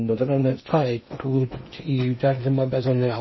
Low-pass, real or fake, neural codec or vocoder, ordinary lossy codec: 7.2 kHz; fake; codec, 16 kHz in and 24 kHz out, 0.8 kbps, FocalCodec, streaming, 65536 codes; MP3, 24 kbps